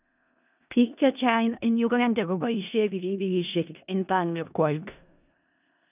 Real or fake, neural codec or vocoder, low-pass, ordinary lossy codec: fake; codec, 16 kHz in and 24 kHz out, 0.4 kbps, LongCat-Audio-Codec, four codebook decoder; 3.6 kHz; none